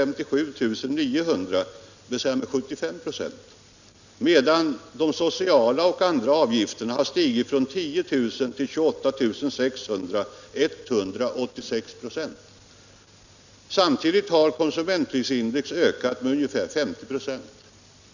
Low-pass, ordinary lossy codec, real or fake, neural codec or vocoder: 7.2 kHz; none; real; none